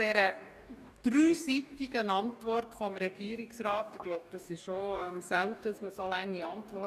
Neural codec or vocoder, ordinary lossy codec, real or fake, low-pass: codec, 44.1 kHz, 2.6 kbps, DAC; none; fake; 14.4 kHz